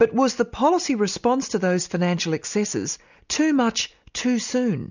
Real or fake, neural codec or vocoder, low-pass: real; none; 7.2 kHz